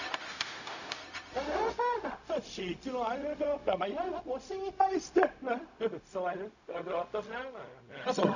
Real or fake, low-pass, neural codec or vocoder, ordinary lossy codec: fake; 7.2 kHz; codec, 16 kHz, 0.4 kbps, LongCat-Audio-Codec; none